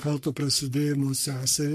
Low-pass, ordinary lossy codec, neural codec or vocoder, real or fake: 14.4 kHz; MP3, 64 kbps; codec, 44.1 kHz, 3.4 kbps, Pupu-Codec; fake